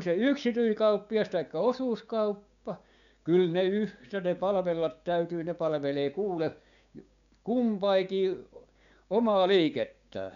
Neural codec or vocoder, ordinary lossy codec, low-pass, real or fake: codec, 16 kHz, 6 kbps, DAC; none; 7.2 kHz; fake